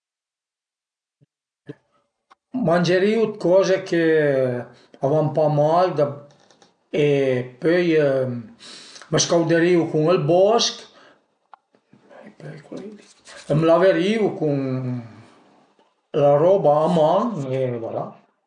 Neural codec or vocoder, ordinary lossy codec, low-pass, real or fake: none; MP3, 96 kbps; 9.9 kHz; real